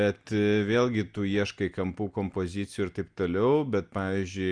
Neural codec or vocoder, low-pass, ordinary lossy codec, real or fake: none; 9.9 kHz; Opus, 32 kbps; real